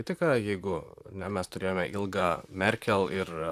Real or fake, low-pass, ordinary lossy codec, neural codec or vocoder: fake; 14.4 kHz; AAC, 96 kbps; vocoder, 44.1 kHz, 128 mel bands, Pupu-Vocoder